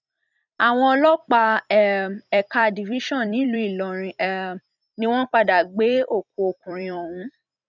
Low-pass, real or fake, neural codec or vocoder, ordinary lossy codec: 7.2 kHz; fake; vocoder, 44.1 kHz, 128 mel bands every 256 samples, BigVGAN v2; none